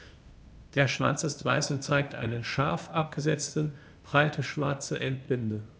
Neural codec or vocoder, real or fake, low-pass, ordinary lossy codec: codec, 16 kHz, 0.8 kbps, ZipCodec; fake; none; none